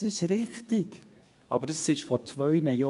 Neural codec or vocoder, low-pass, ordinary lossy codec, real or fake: codec, 24 kHz, 1 kbps, SNAC; 10.8 kHz; none; fake